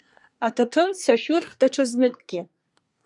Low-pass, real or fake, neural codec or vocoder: 10.8 kHz; fake; codec, 24 kHz, 1 kbps, SNAC